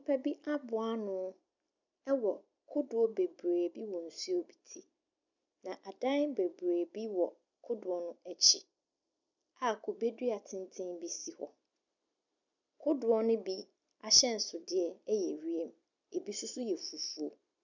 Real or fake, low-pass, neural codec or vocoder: real; 7.2 kHz; none